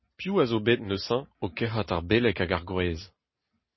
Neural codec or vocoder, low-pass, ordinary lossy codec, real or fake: none; 7.2 kHz; MP3, 24 kbps; real